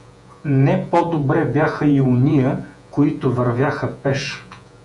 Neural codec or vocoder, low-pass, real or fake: vocoder, 48 kHz, 128 mel bands, Vocos; 10.8 kHz; fake